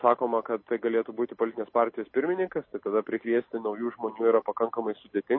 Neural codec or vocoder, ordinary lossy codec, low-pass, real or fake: none; MP3, 24 kbps; 7.2 kHz; real